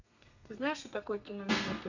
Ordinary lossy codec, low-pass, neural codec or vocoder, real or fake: none; 7.2 kHz; codec, 32 kHz, 1.9 kbps, SNAC; fake